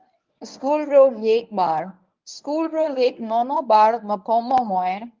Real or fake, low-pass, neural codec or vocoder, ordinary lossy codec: fake; 7.2 kHz; codec, 24 kHz, 0.9 kbps, WavTokenizer, medium speech release version 1; Opus, 32 kbps